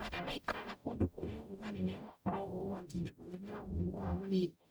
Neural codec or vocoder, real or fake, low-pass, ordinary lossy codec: codec, 44.1 kHz, 0.9 kbps, DAC; fake; none; none